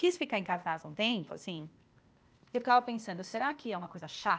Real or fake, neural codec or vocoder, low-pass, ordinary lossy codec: fake; codec, 16 kHz, 0.8 kbps, ZipCodec; none; none